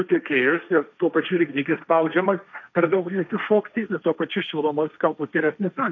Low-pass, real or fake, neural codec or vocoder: 7.2 kHz; fake; codec, 16 kHz, 1.1 kbps, Voila-Tokenizer